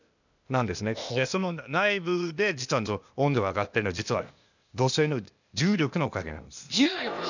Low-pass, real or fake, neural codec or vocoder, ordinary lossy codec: 7.2 kHz; fake; codec, 16 kHz, 0.8 kbps, ZipCodec; none